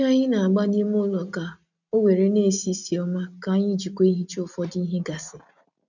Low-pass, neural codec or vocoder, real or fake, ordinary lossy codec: 7.2 kHz; none; real; none